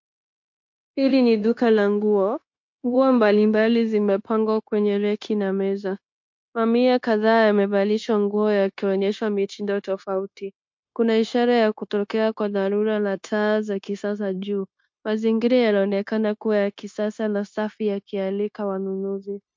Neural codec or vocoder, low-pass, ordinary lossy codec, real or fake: codec, 16 kHz, 0.9 kbps, LongCat-Audio-Codec; 7.2 kHz; MP3, 48 kbps; fake